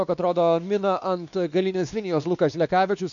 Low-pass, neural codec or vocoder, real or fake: 7.2 kHz; codec, 16 kHz, 2 kbps, X-Codec, WavLM features, trained on Multilingual LibriSpeech; fake